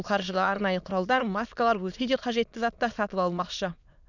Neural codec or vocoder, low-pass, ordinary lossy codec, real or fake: autoencoder, 22.05 kHz, a latent of 192 numbers a frame, VITS, trained on many speakers; 7.2 kHz; none; fake